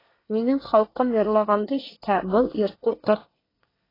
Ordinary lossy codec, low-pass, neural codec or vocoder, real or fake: AAC, 24 kbps; 5.4 kHz; codec, 24 kHz, 1 kbps, SNAC; fake